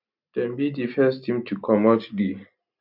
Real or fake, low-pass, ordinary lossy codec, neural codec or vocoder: real; 5.4 kHz; none; none